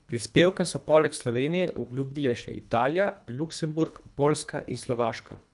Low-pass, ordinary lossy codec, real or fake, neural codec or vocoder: 10.8 kHz; none; fake; codec, 24 kHz, 1.5 kbps, HILCodec